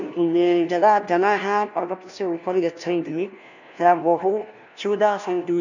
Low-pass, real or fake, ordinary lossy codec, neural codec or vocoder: 7.2 kHz; fake; none; codec, 16 kHz, 1 kbps, FunCodec, trained on LibriTTS, 50 frames a second